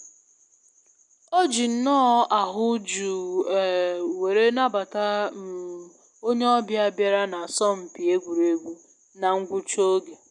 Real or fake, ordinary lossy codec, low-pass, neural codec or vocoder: real; none; 10.8 kHz; none